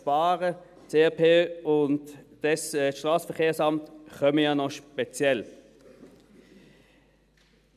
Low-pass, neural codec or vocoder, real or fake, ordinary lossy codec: 14.4 kHz; none; real; none